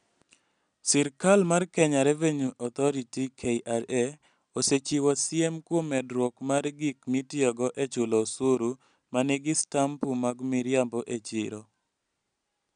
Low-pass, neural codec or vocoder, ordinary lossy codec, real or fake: 9.9 kHz; none; none; real